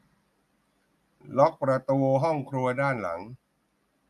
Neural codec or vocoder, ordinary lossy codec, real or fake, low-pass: none; none; real; 14.4 kHz